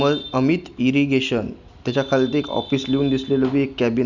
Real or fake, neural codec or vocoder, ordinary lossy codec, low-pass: real; none; none; 7.2 kHz